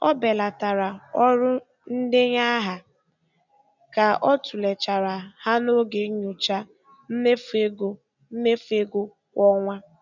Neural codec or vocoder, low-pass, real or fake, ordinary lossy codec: none; 7.2 kHz; real; none